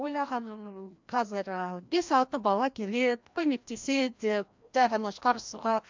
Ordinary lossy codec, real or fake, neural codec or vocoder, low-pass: MP3, 64 kbps; fake; codec, 16 kHz, 1 kbps, FreqCodec, larger model; 7.2 kHz